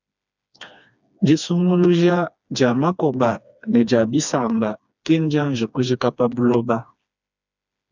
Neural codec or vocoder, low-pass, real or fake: codec, 16 kHz, 2 kbps, FreqCodec, smaller model; 7.2 kHz; fake